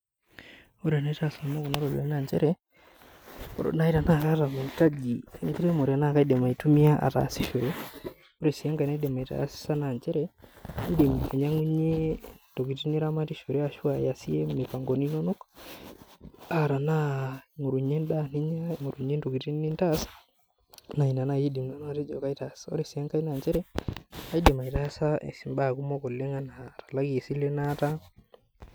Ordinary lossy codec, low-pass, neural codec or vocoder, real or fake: none; none; none; real